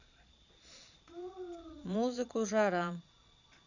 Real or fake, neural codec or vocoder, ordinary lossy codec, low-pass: fake; vocoder, 22.05 kHz, 80 mel bands, Vocos; none; 7.2 kHz